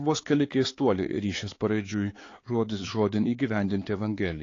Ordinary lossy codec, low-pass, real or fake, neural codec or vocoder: AAC, 32 kbps; 7.2 kHz; fake; codec, 16 kHz, 4 kbps, X-Codec, HuBERT features, trained on balanced general audio